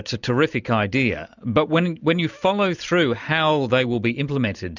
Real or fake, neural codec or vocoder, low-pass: real; none; 7.2 kHz